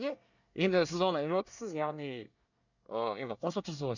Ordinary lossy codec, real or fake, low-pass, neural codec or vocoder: none; fake; 7.2 kHz; codec, 24 kHz, 1 kbps, SNAC